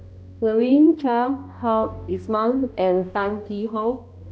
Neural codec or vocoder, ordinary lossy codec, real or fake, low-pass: codec, 16 kHz, 1 kbps, X-Codec, HuBERT features, trained on balanced general audio; none; fake; none